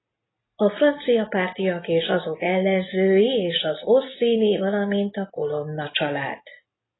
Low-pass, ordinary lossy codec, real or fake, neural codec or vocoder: 7.2 kHz; AAC, 16 kbps; real; none